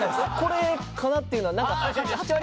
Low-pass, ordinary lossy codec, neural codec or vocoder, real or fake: none; none; none; real